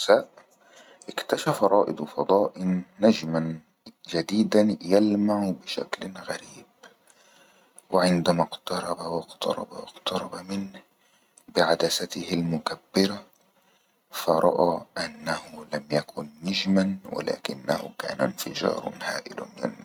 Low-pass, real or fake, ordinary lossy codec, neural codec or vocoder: 19.8 kHz; real; none; none